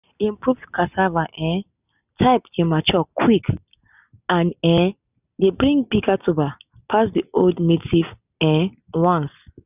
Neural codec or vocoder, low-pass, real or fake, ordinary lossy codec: none; 3.6 kHz; real; none